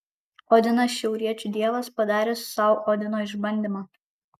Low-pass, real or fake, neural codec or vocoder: 14.4 kHz; real; none